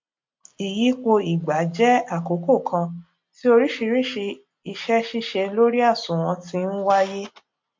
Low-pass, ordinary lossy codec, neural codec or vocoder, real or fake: 7.2 kHz; MP3, 48 kbps; none; real